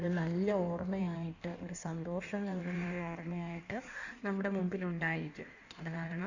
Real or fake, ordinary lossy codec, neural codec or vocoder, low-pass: fake; none; codec, 16 kHz in and 24 kHz out, 1.1 kbps, FireRedTTS-2 codec; 7.2 kHz